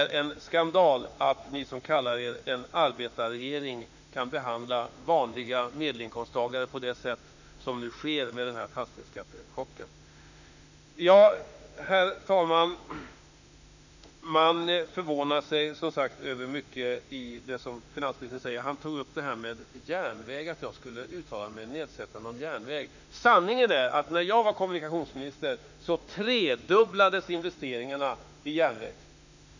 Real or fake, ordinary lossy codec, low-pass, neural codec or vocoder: fake; none; 7.2 kHz; autoencoder, 48 kHz, 32 numbers a frame, DAC-VAE, trained on Japanese speech